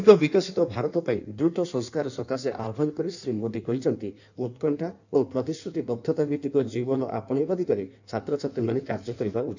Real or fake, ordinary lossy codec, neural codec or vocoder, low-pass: fake; none; codec, 16 kHz in and 24 kHz out, 1.1 kbps, FireRedTTS-2 codec; 7.2 kHz